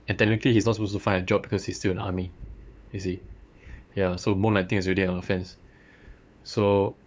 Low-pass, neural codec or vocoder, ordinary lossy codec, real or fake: none; codec, 16 kHz, 8 kbps, FunCodec, trained on LibriTTS, 25 frames a second; none; fake